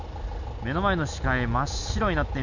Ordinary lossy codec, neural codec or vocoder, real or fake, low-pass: none; none; real; 7.2 kHz